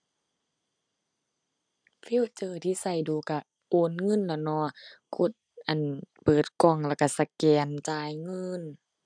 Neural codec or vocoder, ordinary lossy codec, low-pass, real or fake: none; none; 9.9 kHz; real